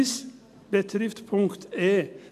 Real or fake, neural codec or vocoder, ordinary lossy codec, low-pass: real; none; AAC, 96 kbps; 14.4 kHz